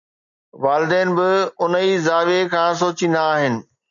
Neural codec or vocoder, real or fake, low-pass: none; real; 7.2 kHz